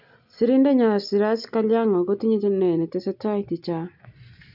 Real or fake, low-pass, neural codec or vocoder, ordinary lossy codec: real; 5.4 kHz; none; none